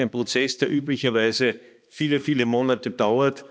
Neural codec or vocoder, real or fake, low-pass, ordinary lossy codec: codec, 16 kHz, 2 kbps, X-Codec, HuBERT features, trained on balanced general audio; fake; none; none